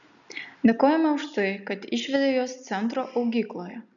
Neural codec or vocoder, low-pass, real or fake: none; 7.2 kHz; real